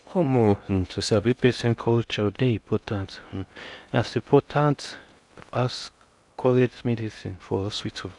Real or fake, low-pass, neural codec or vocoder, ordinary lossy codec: fake; 10.8 kHz; codec, 16 kHz in and 24 kHz out, 0.6 kbps, FocalCodec, streaming, 2048 codes; none